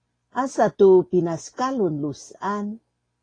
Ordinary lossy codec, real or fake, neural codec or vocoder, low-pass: AAC, 32 kbps; real; none; 9.9 kHz